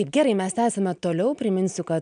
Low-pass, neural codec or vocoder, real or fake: 9.9 kHz; none; real